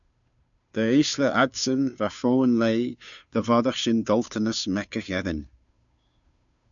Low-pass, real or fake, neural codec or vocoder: 7.2 kHz; fake; codec, 16 kHz, 2 kbps, FunCodec, trained on Chinese and English, 25 frames a second